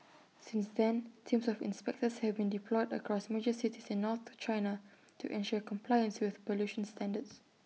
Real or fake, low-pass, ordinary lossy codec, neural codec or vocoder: real; none; none; none